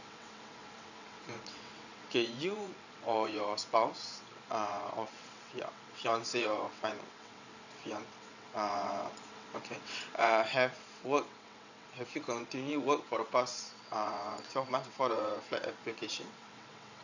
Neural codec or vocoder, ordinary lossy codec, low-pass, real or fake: vocoder, 22.05 kHz, 80 mel bands, WaveNeXt; none; 7.2 kHz; fake